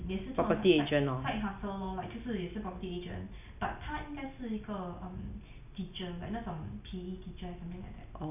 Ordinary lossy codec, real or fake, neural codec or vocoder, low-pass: none; real; none; 3.6 kHz